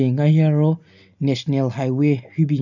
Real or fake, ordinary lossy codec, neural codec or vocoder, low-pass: real; none; none; 7.2 kHz